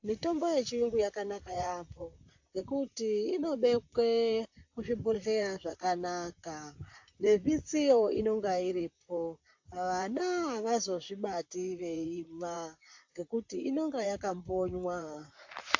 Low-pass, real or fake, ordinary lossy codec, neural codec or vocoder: 7.2 kHz; fake; AAC, 48 kbps; vocoder, 44.1 kHz, 128 mel bands, Pupu-Vocoder